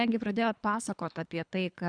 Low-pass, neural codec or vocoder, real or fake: 9.9 kHz; codec, 24 kHz, 3 kbps, HILCodec; fake